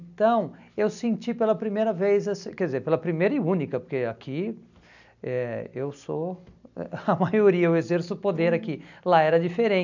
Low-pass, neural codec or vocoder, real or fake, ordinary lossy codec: 7.2 kHz; none; real; none